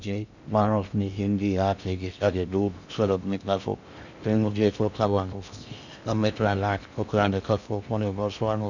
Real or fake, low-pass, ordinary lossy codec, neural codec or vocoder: fake; 7.2 kHz; none; codec, 16 kHz in and 24 kHz out, 0.6 kbps, FocalCodec, streaming, 2048 codes